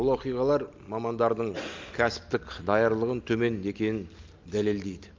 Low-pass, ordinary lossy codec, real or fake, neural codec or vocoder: 7.2 kHz; Opus, 32 kbps; real; none